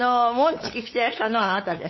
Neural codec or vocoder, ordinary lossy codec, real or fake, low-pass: codec, 16 kHz, 8 kbps, FunCodec, trained on LibriTTS, 25 frames a second; MP3, 24 kbps; fake; 7.2 kHz